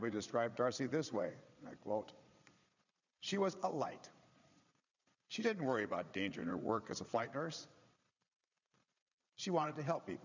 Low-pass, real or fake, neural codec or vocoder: 7.2 kHz; fake; vocoder, 22.05 kHz, 80 mel bands, Vocos